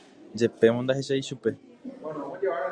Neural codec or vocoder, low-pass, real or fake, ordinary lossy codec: none; 9.9 kHz; real; Opus, 64 kbps